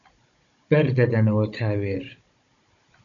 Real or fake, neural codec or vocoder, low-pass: fake; codec, 16 kHz, 16 kbps, FunCodec, trained on Chinese and English, 50 frames a second; 7.2 kHz